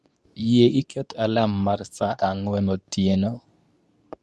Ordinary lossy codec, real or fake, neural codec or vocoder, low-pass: none; fake; codec, 24 kHz, 0.9 kbps, WavTokenizer, medium speech release version 2; none